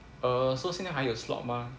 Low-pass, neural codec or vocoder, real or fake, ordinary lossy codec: none; none; real; none